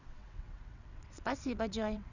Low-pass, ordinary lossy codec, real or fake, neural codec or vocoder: 7.2 kHz; none; fake; vocoder, 22.05 kHz, 80 mel bands, WaveNeXt